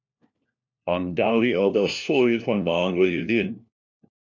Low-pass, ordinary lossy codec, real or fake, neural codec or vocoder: 7.2 kHz; MP3, 64 kbps; fake; codec, 16 kHz, 1 kbps, FunCodec, trained on LibriTTS, 50 frames a second